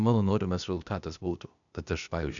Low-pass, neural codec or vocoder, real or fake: 7.2 kHz; codec, 16 kHz, 0.8 kbps, ZipCodec; fake